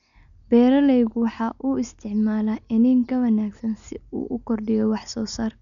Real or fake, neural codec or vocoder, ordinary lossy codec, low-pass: real; none; none; 7.2 kHz